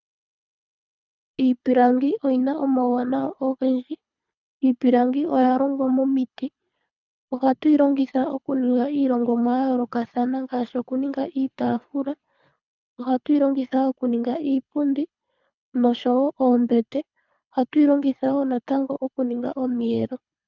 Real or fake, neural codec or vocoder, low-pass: fake; codec, 24 kHz, 3 kbps, HILCodec; 7.2 kHz